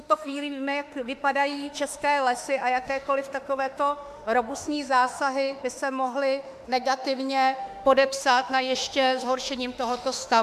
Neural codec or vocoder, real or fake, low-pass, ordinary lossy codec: autoencoder, 48 kHz, 32 numbers a frame, DAC-VAE, trained on Japanese speech; fake; 14.4 kHz; MP3, 96 kbps